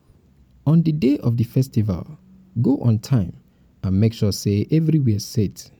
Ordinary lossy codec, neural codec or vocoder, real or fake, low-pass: none; none; real; 19.8 kHz